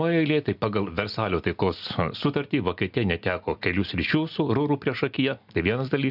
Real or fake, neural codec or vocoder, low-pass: real; none; 5.4 kHz